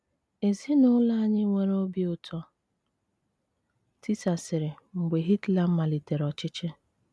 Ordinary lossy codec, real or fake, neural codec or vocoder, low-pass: none; real; none; none